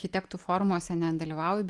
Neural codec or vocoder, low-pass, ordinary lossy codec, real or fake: none; 10.8 kHz; Opus, 24 kbps; real